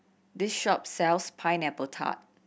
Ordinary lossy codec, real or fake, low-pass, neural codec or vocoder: none; real; none; none